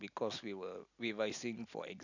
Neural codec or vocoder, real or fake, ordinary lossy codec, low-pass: none; real; none; 7.2 kHz